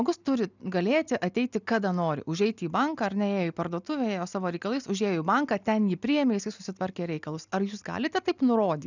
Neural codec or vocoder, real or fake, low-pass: none; real; 7.2 kHz